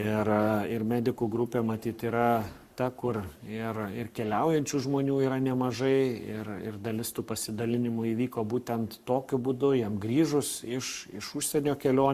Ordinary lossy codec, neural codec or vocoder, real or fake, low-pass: Opus, 64 kbps; codec, 44.1 kHz, 7.8 kbps, Pupu-Codec; fake; 14.4 kHz